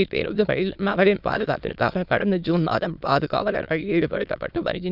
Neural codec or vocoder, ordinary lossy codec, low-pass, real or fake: autoencoder, 22.05 kHz, a latent of 192 numbers a frame, VITS, trained on many speakers; none; 5.4 kHz; fake